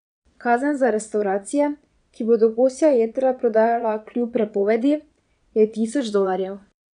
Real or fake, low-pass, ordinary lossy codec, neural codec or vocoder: fake; 9.9 kHz; none; vocoder, 22.05 kHz, 80 mel bands, Vocos